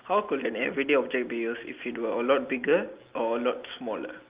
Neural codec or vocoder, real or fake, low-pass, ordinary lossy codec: none; real; 3.6 kHz; Opus, 32 kbps